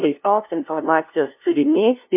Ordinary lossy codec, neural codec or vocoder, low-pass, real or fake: MP3, 32 kbps; codec, 16 kHz, 0.5 kbps, FunCodec, trained on LibriTTS, 25 frames a second; 3.6 kHz; fake